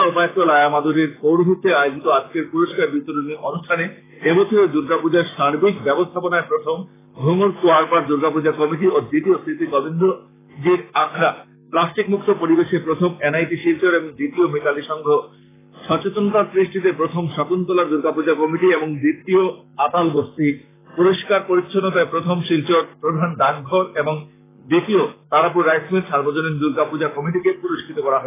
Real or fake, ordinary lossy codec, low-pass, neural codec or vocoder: fake; AAC, 16 kbps; 3.6 kHz; codec, 44.1 kHz, 7.8 kbps, Pupu-Codec